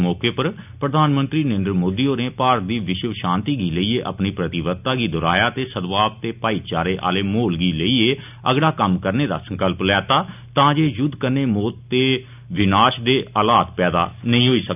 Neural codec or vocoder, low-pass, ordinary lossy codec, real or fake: none; 3.6 kHz; none; real